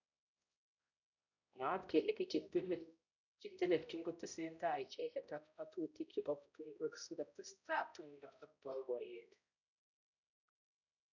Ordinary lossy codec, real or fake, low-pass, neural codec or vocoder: none; fake; 7.2 kHz; codec, 16 kHz, 0.5 kbps, X-Codec, HuBERT features, trained on balanced general audio